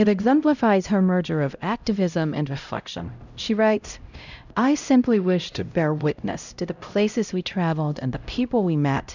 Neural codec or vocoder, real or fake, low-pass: codec, 16 kHz, 0.5 kbps, X-Codec, HuBERT features, trained on LibriSpeech; fake; 7.2 kHz